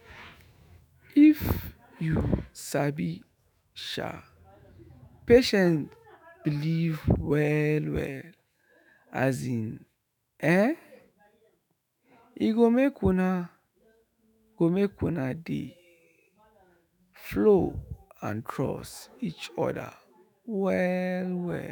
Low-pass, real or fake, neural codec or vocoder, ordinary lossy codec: none; fake; autoencoder, 48 kHz, 128 numbers a frame, DAC-VAE, trained on Japanese speech; none